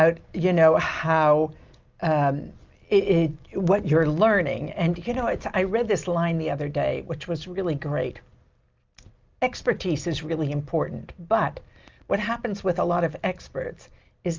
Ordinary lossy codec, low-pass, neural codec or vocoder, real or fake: Opus, 24 kbps; 7.2 kHz; none; real